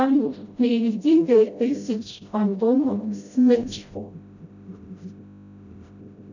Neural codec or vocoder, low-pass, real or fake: codec, 16 kHz, 0.5 kbps, FreqCodec, smaller model; 7.2 kHz; fake